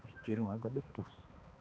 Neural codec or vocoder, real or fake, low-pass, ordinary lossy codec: codec, 16 kHz, 2 kbps, X-Codec, HuBERT features, trained on balanced general audio; fake; none; none